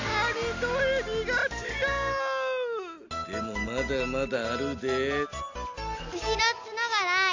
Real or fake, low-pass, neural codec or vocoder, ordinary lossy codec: real; 7.2 kHz; none; AAC, 48 kbps